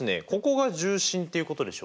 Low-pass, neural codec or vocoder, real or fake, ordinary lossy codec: none; none; real; none